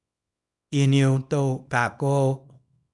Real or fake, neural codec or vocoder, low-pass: fake; codec, 24 kHz, 0.9 kbps, WavTokenizer, small release; 10.8 kHz